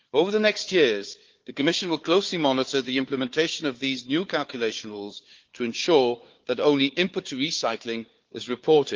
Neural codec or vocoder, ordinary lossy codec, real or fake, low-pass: codec, 16 kHz, 4 kbps, FunCodec, trained on Chinese and English, 50 frames a second; Opus, 32 kbps; fake; 7.2 kHz